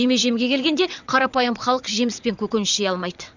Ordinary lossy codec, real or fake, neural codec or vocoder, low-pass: none; fake; vocoder, 22.05 kHz, 80 mel bands, WaveNeXt; 7.2 kHz